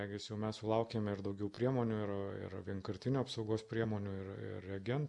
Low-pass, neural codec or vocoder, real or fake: 10.8 kHz; none; real